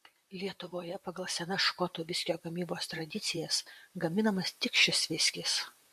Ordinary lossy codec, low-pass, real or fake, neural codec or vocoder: MP3, 64 kbps; 14.4 kHz; fake; vocoder, 44.1 kHz, 128 mel bands, Pupu-Vocoder